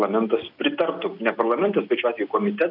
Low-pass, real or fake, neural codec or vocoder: 5.4 kHz; real; none